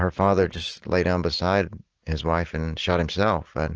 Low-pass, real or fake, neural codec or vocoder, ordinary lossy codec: 7.2 kHz; real; none; Opus, 16 kbps